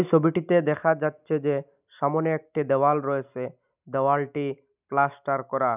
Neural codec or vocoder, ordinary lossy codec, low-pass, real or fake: none; none; 3.6 kHz; real